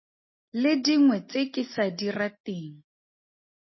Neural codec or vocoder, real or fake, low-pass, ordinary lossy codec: none; real; 7.2 kHz; MP3, 24 kbps